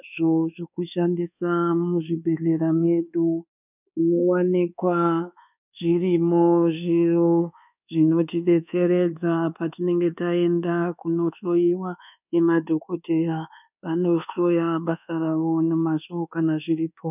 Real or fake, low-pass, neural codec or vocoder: fake; 3.6 kHz; codec, 24 kHz, 1.2 kbps, DualCodec